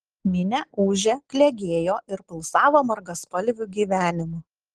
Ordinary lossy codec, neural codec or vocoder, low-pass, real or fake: Opus, 16 kbps; none; 10.8 kHz; real